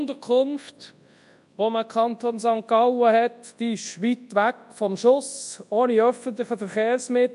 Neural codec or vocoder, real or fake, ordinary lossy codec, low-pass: codec, 24 kHz, 0.9 kbps, WavTokenizer, large speech release; fake; MP3, 64 kbps; 10.8 kHz